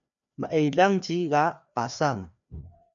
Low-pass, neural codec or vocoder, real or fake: 7.2 kHz; codec, 16 kHz, 2 kbps, FreqCodec, larger model; fake